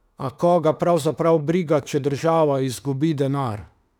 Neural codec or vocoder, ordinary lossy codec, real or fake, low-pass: autoencoder, 48 kHz, 32 numbers a frame, DAC-VAE, trained on Japanese speech; none; fake; 19.8 kHz